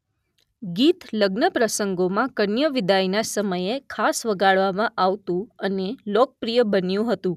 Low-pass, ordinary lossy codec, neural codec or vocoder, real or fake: 14.4 kHz; none; none; real